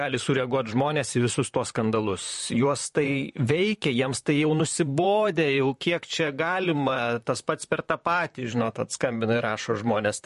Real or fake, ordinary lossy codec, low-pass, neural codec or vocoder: fake; MP3, 48 kbps; 14.4 kHz; vocoder, 44.1 kHz, 128 mel bands, Pupu-Vocoder